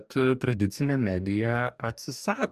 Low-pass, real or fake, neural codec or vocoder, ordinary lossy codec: 14.4 kHz; fake; codec, 44.1 kHz, 2.6 kbps, DAC; AAC, 96 kbps